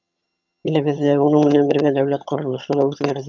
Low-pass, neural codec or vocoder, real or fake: 7.2 kHz; vocoder, 22.05 kHz, 80 mel bands, HiFi-GAN; fake